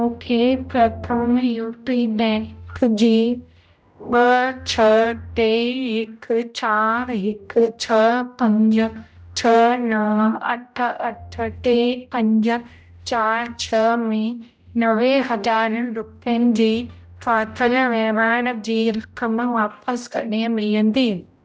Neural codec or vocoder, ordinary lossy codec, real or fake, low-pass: codec, 16 kHz, 0.5 kbps, X-Codec, HuBERT features, trained on general audio; none; fake; none